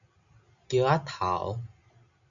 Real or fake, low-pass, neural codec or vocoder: real; 7.2 kHz; none